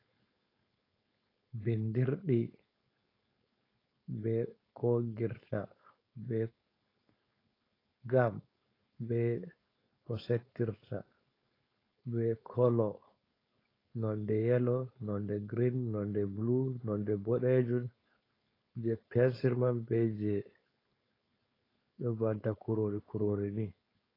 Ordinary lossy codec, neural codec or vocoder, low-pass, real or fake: AAC, 24 kbps; codec, 16 kHz, 4.8 kbps, FACodec; 5.4 kHz; fake